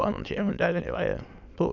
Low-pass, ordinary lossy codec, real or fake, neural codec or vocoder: 7.2 kHz; none; fake; autoencoder, 22.05 kHz, a latent of 192 numbers a frame, VITS, trained on many speakers